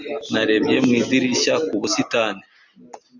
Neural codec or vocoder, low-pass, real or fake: none; 7.2 kHz; real